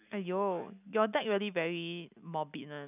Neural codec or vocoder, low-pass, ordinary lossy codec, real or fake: none; 3.6 kHz; none; real